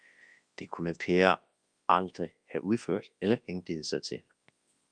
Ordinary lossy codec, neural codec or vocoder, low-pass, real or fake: Opus, 32 kbps; codec, 24 kHz, 0.9 kbps, WavTokenizer, large speech release; 9.9 kHz; fake